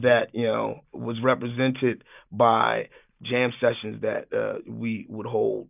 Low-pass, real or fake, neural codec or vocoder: 3.6 kHz; real; none